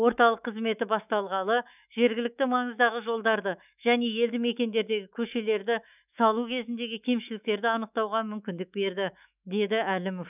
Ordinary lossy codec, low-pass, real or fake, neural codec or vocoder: none; 3.6 kHz; fake; autoencoder, 48 kHz, 128 numbers a frame, DAC-VAE, trained on Japanese speech